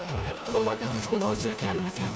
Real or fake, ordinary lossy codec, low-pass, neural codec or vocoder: fake; none; none; codec, 16 kHz, 1 kbps, FunCodec, trained on LibriTTS, 50 frames a second